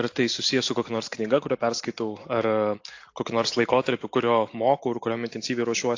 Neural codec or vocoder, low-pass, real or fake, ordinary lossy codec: none; 7.2 kHz; real; AAC, 48 kbps